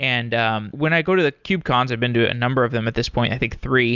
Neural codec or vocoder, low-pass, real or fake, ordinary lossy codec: none; 7.2 kHz; real; Opus, 64 kbps